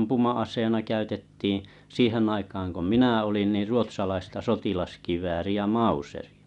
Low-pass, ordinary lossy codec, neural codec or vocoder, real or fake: 10.8 kHz; none; none; real